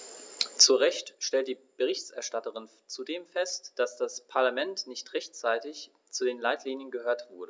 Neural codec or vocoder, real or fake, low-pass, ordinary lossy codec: none; real; none; none